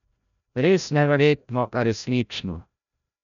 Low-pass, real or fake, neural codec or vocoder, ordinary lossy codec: 7.2 kHz; fake; codec, 16 kHz, 0.5 kbps, FreqCodec, larger model; none